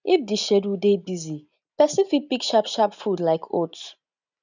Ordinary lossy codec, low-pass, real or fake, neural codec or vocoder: none; 7.2 kHz; real; none